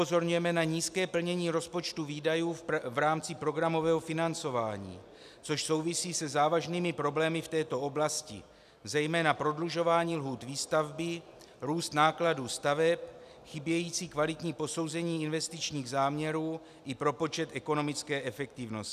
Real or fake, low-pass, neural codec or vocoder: real; 14.4 kHz; none